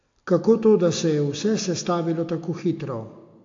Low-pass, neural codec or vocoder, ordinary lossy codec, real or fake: 7.2 kHz; none; AAC, 48 kbps; real